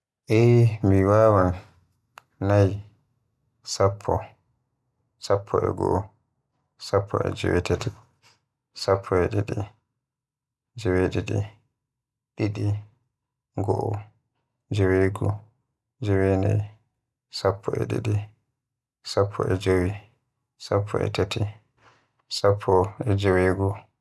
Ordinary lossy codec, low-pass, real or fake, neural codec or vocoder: none; none; real; none